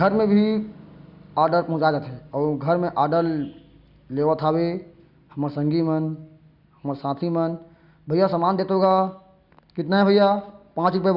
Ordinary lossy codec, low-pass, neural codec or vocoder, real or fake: Opus, 64 kbps; 5.4 kHz; none; real